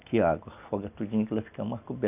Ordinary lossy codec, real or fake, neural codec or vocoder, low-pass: none; real; none; 3.6 kHz